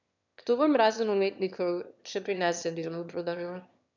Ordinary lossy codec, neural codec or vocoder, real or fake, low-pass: none; autoencoder, 22.05 kHz, a latent of 192 numbers a frame, VITS, trained on one speaker; fake; 7.2 kHz